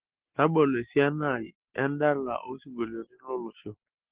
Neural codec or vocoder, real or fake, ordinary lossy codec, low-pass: none; real; Opus, 16 kbps; 3.6 kHz